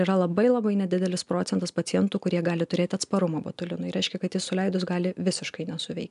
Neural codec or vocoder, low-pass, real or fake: none; 10.8 kHz; real